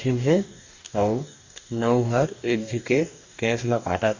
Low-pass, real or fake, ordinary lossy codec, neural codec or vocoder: 7.2 kHz; fake; Opus, 64 kbps; codec, 44.1 kHz, 2.6 kbps, DAC